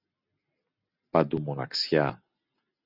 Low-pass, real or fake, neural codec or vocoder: 5.4 kHz; real; none